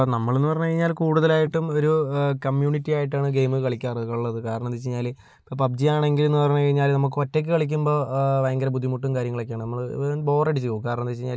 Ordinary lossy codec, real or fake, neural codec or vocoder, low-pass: none; real; none; none